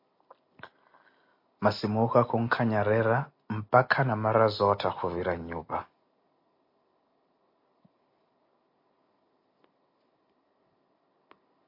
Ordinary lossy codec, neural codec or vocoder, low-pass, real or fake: MP3, 32 kbps; none; 5.4 kHz; real